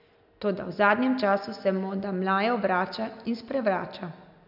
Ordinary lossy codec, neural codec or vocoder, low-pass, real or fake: none; none; 5.4 kHz; real